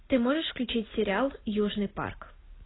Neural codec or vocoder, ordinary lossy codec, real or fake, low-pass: none; AAC, 16 kbps; real; 7.2 kHz